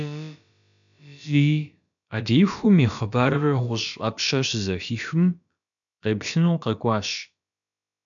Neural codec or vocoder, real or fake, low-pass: codec, 16 kHz, about 1 kbps, DyCAST, with the encoder's durations; fake; 7.2 kHz